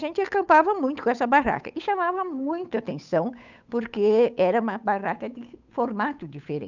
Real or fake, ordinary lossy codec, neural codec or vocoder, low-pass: fake; none; codec, 16 kHz, 8 kbps, FunCodec, trained on Chinese and English, 25 frames a second; 7.2 kHz